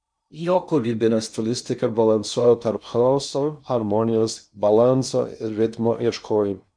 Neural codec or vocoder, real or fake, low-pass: codec, 16 kHz in and 24 kHz out, 0.6 kbps, FocalCodec, streaming, 2048 codes; fake; 9.9 kHz